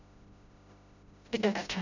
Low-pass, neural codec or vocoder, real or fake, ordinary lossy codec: 7.2 kHz; codec, 16 kHz, 0.5 kbps, FreqCodec, smaller model; fake; none